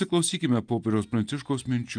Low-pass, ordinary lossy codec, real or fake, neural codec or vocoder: 9.9 kHz; Opus, 32 kbps; real; none